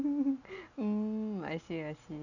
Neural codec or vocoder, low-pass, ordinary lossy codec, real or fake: none; 7.2 kHz; none; real